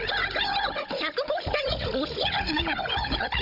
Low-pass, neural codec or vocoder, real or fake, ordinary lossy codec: 5.4 kHz; codec, 16 kHz, 16 kbps, FunCodec, trained on Chinese and English, 50 frames a second; fake; none